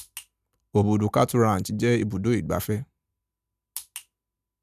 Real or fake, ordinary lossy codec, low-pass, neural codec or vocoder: real; none; 14.4 kHz; none